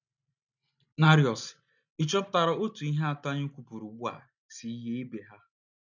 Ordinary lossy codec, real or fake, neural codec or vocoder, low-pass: none; real; none; 7.2 kHz